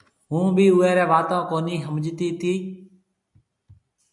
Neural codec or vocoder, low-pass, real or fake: none; 10.8 kHz; real